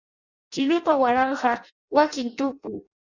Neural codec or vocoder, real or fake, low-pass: codec, 16 kHz in and 24 kHz out, 0.6 kbps, FireRedTTS-2 codec; fake; 7.2 kHz